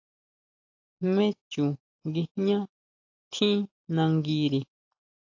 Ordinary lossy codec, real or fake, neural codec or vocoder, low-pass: AAC, 48 kbps; real; none; 7.2 kHz